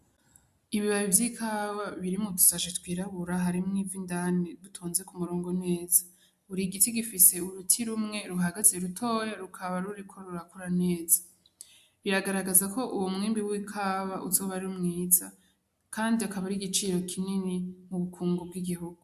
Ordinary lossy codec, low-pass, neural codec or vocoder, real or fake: AAC, 96 kbps; 14.4 kHz; none; real